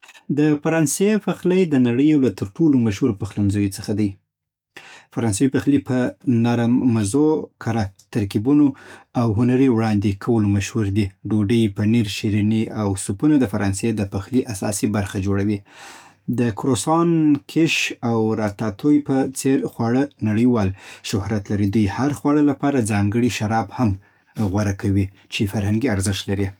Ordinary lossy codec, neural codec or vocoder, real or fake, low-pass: none; codec, 44.1 kHz, 7.8 kbps, DAC; fake; 19.8 kHz